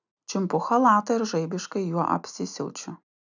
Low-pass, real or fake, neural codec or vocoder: 7.2 kHz; real; none